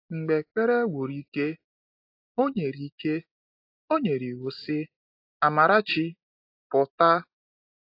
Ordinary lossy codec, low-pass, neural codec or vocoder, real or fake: AAC, 32 kbps; 5.4 kHz; none; real